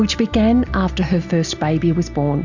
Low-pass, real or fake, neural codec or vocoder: 7.2 kHz; real; none